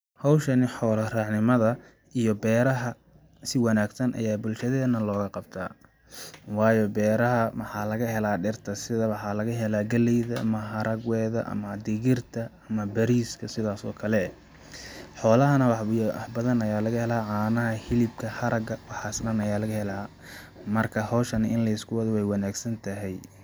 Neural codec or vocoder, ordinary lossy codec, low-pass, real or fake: none; none; none; real